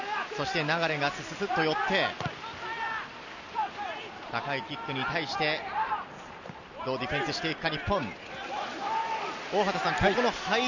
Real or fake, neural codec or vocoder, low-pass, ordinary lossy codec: real; none; 7.2 kHz; none